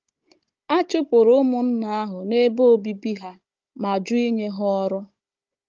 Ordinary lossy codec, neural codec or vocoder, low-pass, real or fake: Opus, 24 kbps; codec, 16 kHz, 16 kbps, FunCodec, trained on Chinese and English, 50 frames a second; 7.2 kHz; fake